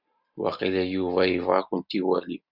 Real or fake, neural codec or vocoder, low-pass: real; none; 5.4 kHz